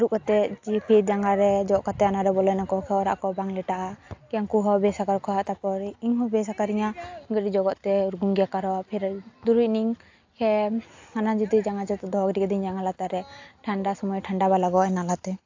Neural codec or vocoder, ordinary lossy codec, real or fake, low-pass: none; AAC, 48 kbps; real; 7.2 kHz